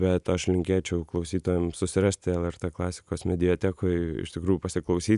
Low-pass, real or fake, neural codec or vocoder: 10.8 kHz; real; none